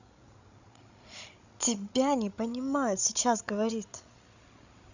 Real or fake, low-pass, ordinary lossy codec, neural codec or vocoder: fake; 7.2 kHz; none; codec, 16 kHz, 16 kbps, FreqCodec, larger model